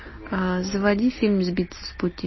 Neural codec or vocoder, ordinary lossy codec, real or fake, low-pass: none; MP3, 24 kbps; real; 7.2 kHz